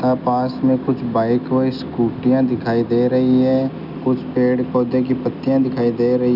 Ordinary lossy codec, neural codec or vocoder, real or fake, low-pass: none; none; real; 5.4 kHz